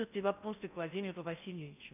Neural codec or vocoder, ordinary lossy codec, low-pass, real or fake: codec, 16 kHz in and 24 kHz out, 0.6 kbps, FocalCodec, streaming, 4096 codes; none; 3.6 kHz; fake